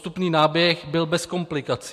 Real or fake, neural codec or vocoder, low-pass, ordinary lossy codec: real; none; 14.4 kHz; MP3, 64 kbps